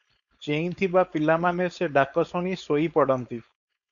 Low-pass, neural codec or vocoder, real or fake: 7.2 kHz; codec, 16 kHz, 4.8 kbps, FACodec; fake